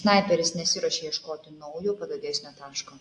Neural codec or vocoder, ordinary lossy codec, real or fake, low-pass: none; AAC, 48 kbps; real; 9.9 kHz